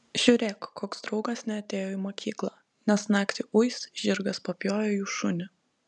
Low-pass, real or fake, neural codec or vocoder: 10.8 kHz; real; none